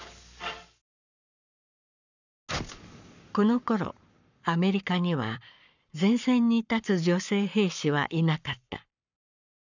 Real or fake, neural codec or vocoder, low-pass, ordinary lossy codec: fake; codec, 44.1 kHz, 7.8 kbps, Pupu-Codec; 7.2 kHz; none